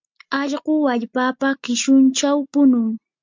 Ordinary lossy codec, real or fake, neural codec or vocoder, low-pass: MP3, 64 kbps; real; none; 7.2 kHz